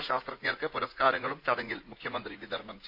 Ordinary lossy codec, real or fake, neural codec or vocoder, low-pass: AAC, 48 kbps; fake; vocoder, 44.1 kHz, 80 mel bands, Vocos; 5.4 kHz